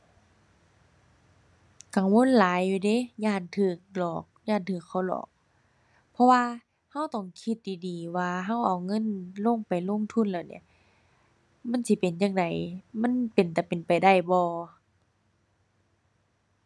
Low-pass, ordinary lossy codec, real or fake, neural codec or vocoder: none; none; real; none